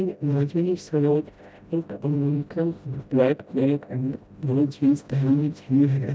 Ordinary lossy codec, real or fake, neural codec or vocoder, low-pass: none; fake; codec, 16 kHz, 0.5 kbps, FreqCodec, smaller model; none